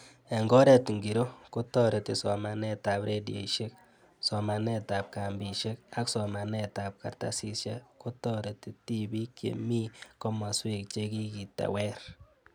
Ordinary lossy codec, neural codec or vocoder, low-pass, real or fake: none; none; none; real